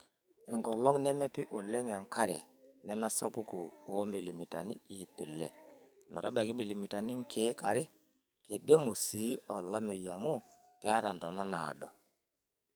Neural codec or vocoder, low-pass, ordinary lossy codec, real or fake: codec, 44.1 kHz, 2.6 kbps, SNAC; none; none; fake